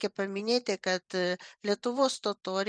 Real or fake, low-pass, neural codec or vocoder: fake; 9.9 kHz; vocoder, 44.1 kHz, 128 mel bands every 512 samples, BigVGAN v2